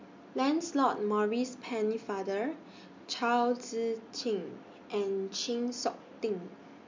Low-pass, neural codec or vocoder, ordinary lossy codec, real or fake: 7.2 kHz; none; MP3, 64 kbps; real